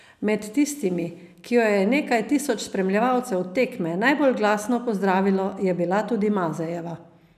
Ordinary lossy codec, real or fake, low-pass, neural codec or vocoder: none; real; 14.4 kHz; none